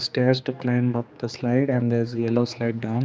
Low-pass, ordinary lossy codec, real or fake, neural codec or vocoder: none; none; fake; codec, 16 kHz, 2 kbps, X-Codec, HuBERT features, trained on general audio